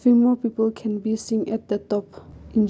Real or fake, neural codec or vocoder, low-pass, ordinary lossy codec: real; none; none; none